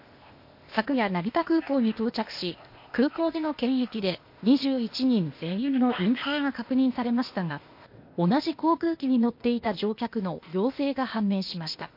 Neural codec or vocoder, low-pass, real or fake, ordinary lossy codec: codec, 16 kHz, 0.8 kbps, ZipCodec; 5.4 kHz; fake; MP3, 32 kbps